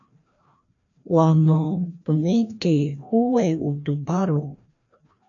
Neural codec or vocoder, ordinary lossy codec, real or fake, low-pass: codec, 16 kHz, 1 kbps, FreqCodec, larger model; AAC, 64 kbps; fake; 7.2 kHz